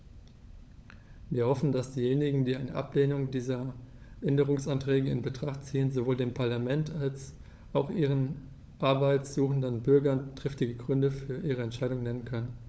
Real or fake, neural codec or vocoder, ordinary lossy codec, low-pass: fake; codec, 16 kHz, 16 kbps, FunCodec, trained on LibriTTS, 50 frames a second; none; none